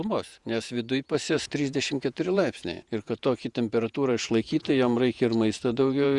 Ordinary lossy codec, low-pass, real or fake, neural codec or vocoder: Opus, 64 kbps; 10.8 kHz; fake; vocoder, 48 kHz, 128 mel bands, Vocos